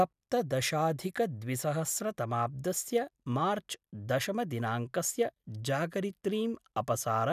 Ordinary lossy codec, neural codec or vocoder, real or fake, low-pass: none; none; real; 14.4 kHz